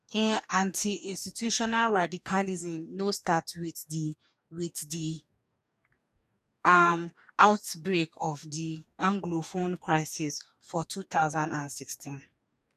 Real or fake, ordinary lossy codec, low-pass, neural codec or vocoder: fake; none; 14.4 kHz; codec, 44.1 kHz, 2.6 kbps, DAC